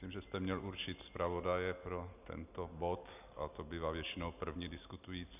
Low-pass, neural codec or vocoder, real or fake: 3.6 kHz; none; real